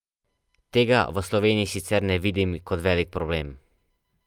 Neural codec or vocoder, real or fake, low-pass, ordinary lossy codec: none; real; 19.8 kHz; Opus, 32 kbps